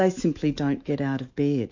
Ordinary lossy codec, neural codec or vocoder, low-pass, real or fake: AAC, 48 kbps; autoencoder, 48 kHz, 128 numbers a frame, DAC-VAE, trained on Japanese speech; 7.2 kHz; fake